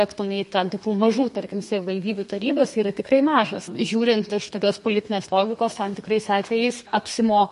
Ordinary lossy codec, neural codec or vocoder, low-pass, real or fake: MP3, 48 kbps; codec, 32 kHz, 1.9 kbps, SNAC; 14.4 kHz; fake